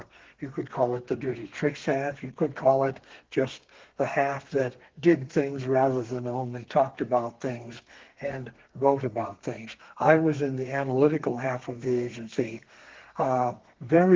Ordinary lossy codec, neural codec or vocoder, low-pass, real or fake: Opus, 16 kbps; codec, 32 kHz, 1.9 kbps, SNAC; 7.2 kHz; fake